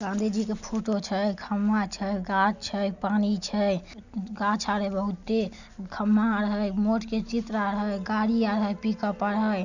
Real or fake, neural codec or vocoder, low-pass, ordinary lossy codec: real; none; 7.2 kHz; none